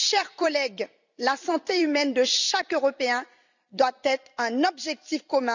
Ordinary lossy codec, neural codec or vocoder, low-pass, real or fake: none; vocoder, 44.1 kHz, 128 mel bands every 256 samples, BigVGAN v2; 7.2 kHz; fake